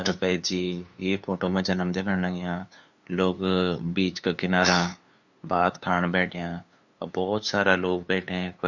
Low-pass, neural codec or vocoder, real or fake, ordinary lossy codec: 7.2 kHz; codec, 16 kHz, 2 kbps, FunCodec, trained on LibriTTS, 25 frames a second; fake; Opus, 64 kbps